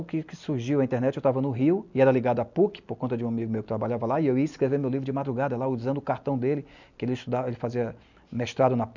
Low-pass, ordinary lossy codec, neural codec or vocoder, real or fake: 7.2 kHz; none; none; real